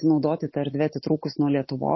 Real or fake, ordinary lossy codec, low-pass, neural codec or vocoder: real; MP3, 24 kbps; 7.2 kHz; none